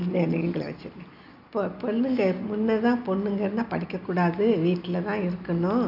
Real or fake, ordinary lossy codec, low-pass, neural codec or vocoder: real; none; 5.4 kHz; none